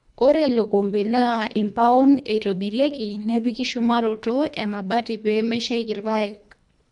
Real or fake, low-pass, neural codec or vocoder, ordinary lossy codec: fake; 10.8 kHz; codec, 24 kHz, 1.5 kbps, HILCodec; none